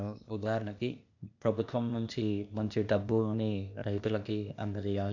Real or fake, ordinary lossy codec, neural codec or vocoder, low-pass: fake; none; codec, 16 kHz, 0.8 kbps, ZipCodec; 7.2 kHz